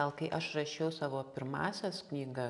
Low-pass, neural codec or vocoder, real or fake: 10.8 kHz; none; real